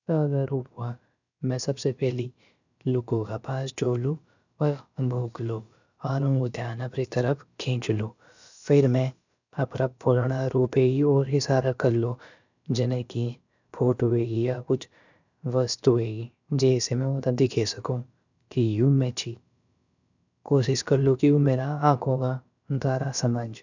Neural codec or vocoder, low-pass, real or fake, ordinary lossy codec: codec, 16 kHz, about 1 kbps, DyCAST, with the encoder's durations; 7.2 kHz; fake; none